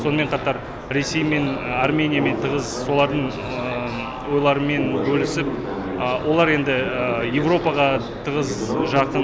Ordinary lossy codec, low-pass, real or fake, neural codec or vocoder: none; none; real; none